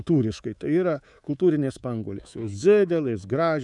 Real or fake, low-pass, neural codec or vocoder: fake; 10.8 kHz; codec, 24 kHz, 3.1 kbps, DualCodec